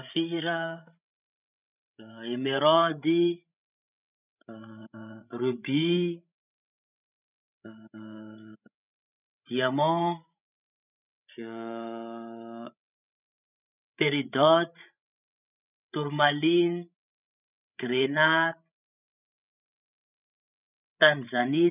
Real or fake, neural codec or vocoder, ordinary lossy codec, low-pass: fake; codec, 16 kHz, 16 kbps, FreqCodec, larger model; none; 3.6 kHz